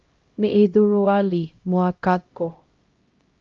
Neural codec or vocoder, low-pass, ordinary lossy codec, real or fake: codec, 16 kHz, 0.5 kbps, X-Codec, HuBERT features, trained on LibriSpeech; 7.2 kHz; Opus, 24 kbps; fake